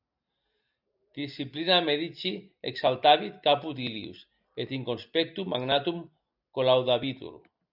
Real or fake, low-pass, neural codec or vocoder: real; 5.4 kHz; none